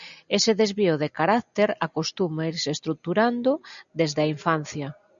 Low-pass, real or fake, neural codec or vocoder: 7.2 kHz; real; none